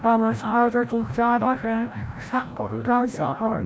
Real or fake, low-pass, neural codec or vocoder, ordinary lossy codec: fake; none; codec, 16 kHz, 0.5 kbps, FreqCodec, larger model; none